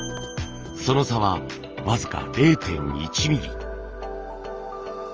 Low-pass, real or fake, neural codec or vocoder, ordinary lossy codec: 7.2 kHz; real; none; Opus, 24 kbps